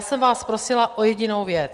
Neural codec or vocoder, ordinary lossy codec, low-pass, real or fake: none; Opus, 24 kbps; 10.8 kHz; real